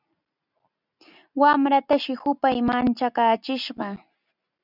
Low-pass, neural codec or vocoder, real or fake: 5.4 kHz; none; real